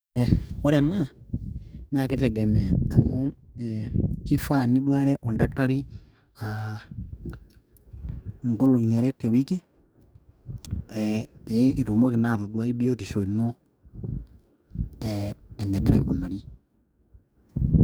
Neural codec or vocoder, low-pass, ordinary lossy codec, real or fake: codec, 44.1 kHz, 2.6 kbps, DAC; none; none; fake